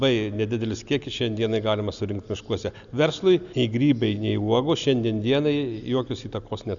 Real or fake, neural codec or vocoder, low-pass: real; none; 7.2 kHz